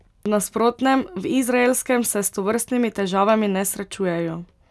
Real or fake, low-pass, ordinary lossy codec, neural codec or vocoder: real; none; none; none